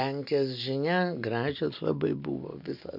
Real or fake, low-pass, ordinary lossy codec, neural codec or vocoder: real; 5.4 kHz; MP3, 48 kbps; none